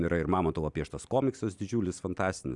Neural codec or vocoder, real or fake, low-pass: none; real; 10.8 kHz